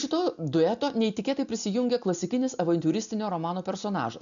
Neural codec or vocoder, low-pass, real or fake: none; 7.2 kHz; real